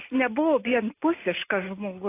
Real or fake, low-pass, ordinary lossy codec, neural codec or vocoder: real; 3.6 kHz; AAC, 24 kbps; none